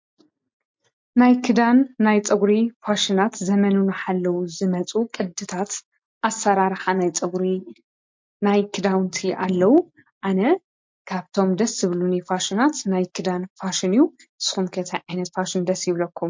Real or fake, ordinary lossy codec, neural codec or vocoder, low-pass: real; MP3, 48 kbps; none; 7.2 kHz